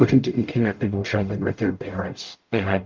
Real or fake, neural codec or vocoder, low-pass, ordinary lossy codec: fake; codec, 44.1 kHz, 0.9 kbps, DAC; 7.2 kHz; Opus, 24 kbps